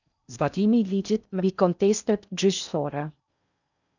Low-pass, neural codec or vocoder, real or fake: 7.2 kHz; codec, 16 kHz in and 24 kHz out, 0.6 kbps, FocalCodec, streaming, 4096 codes; fake